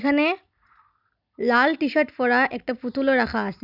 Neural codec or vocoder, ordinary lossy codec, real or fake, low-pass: none; none; real; 5.4 kHz